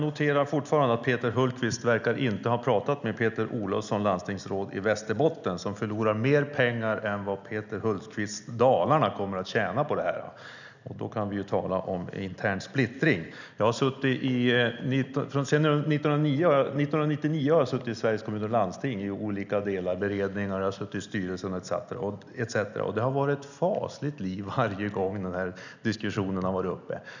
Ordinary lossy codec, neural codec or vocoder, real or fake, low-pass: none; none; real; 7.2 kHz